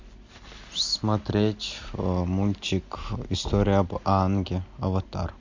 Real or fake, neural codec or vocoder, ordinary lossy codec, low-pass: real; none; MP3, 48 kbps; 7.2 kHz